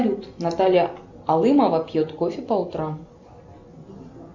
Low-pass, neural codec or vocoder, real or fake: 7.2 kHz; none; real